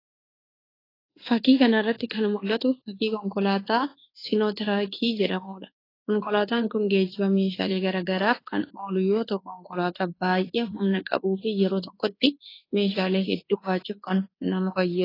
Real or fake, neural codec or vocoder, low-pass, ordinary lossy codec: fake; codec, 24 kHz, 1.2 kbps, DualCodec; 5.4 kHz; AAC, 24 kbps